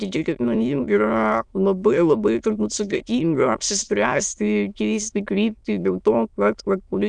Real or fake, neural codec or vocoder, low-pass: fake; autoencoder, 22.05 kHz, a latent of 192 numbers a frame, VITS, trained on many speakers; 9.9 kHz